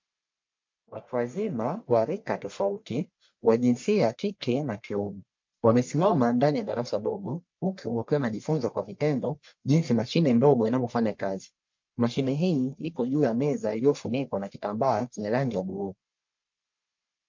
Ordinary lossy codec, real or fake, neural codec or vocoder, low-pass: MP3, 48 kbps; fake; codec, 44.1 kHz, 1.7 kbps, Pupu-Codec; 7.2 kHz